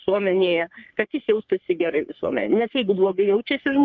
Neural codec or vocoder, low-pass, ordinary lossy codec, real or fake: codec, 44.1 kHz, 3.4 kbps, Pupu-Codec; 7.2 kHz; Opus, 24 kbps; fake